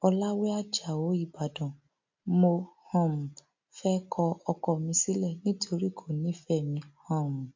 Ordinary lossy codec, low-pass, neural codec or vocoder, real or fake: MP3, 48 kbps; 7.2 kHz; none; real